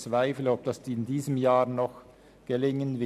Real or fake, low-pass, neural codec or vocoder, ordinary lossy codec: real; 14.4 kHz; none; none